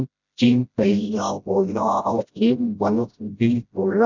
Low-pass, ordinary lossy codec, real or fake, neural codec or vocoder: 7.2 kHz; none; fake; codec, 16 kHz, 0.5 kbps, FreqCodec, smaller model